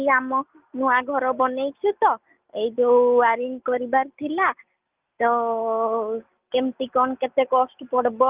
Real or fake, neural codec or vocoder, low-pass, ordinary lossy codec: real; none; 3.6 kHz; Opus, 24 kbps